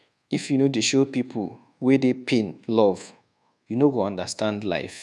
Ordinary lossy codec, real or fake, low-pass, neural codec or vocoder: none; fake; none; codec, 24 kHz, 1.2 kbps, DualCodec